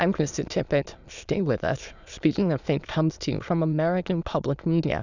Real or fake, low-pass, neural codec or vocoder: fake; 7.2 kHz; autoencoder, 22.05 kHz, a latent of 192 numbers a frame, VITS, trained on many speakers